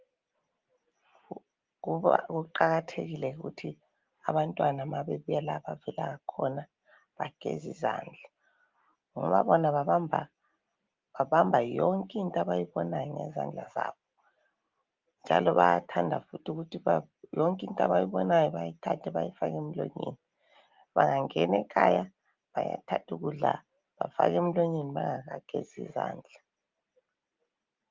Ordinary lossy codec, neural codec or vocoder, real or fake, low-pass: Opus, 32 kbps; none; real; 7.2 kHz